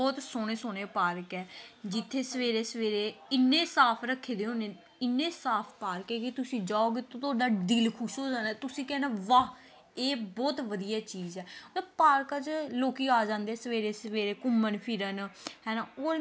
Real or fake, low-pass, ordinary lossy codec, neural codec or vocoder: real; none; none; none